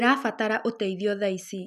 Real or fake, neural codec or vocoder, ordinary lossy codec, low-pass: real; none; none; 14.4 kHz